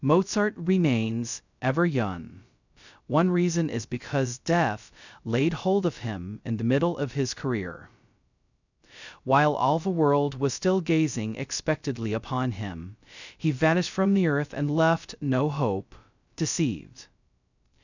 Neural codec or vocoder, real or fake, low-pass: codec, 16 kHz, 0.2 kbps, FocalCodec; fake; 7.2 kHz